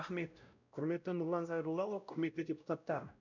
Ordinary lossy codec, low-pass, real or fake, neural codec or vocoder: none; 7.2 kHz; fake; codec, 16 kHz, 0.5 kbps, X-Codec, WavLM features, trained on Multilingual LibriSpeech